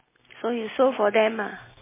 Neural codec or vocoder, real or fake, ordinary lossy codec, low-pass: none; real; MP3, 16 kbps; 3.6 kHz